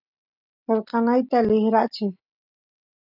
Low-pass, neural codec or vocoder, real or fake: 5.4 kHz; none; real